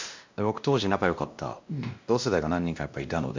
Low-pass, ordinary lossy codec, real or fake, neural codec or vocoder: 7.2 kHz; MP3, 64 kbps; fake; codec, 16 kHz, 1 kbps, X-Codec, WavLM features, trained on Multilingual LibriSpeech